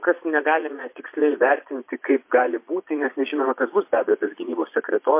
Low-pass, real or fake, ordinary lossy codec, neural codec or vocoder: 3.6 kHz; fake; MP3, 24 kbps; vocoder, 22.05 kHz, 80 mel bands, Vocos